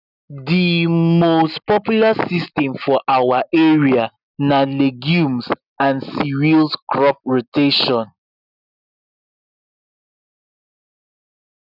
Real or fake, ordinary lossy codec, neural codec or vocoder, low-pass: real; none; none; 5.4 kHz